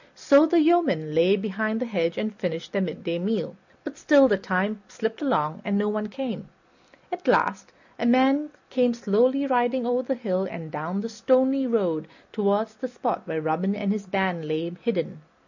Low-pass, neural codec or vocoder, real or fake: 7.2 kHz; none; real